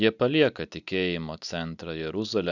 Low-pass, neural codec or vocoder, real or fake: 7.2 kHz; none; real